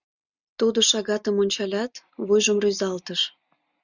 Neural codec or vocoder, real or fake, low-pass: none; real; 7.2 kHz